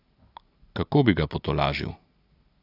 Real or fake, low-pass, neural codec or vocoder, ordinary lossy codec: real; 5.4 kHz; none; AAC, 48 kbps